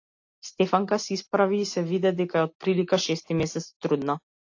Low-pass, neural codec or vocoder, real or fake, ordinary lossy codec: 7.2 kHz; none; real; AAC, 48 kbps